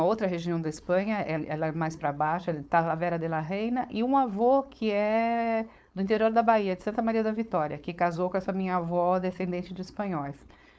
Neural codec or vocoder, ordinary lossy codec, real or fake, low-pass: codec, 16 kHz, 4.8 kbps, FACodec; none; fake; none